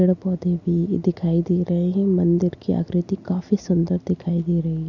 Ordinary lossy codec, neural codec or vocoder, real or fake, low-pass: none; none; real; 7.2 kHz